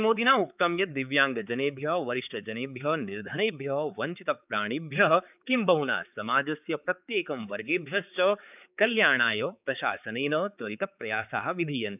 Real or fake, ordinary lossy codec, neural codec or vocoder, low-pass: fake; none; codec, 16 kHz, 4 kbps, X-Codec, HuBERT features, trained on balanced general audio; 3.6 kHz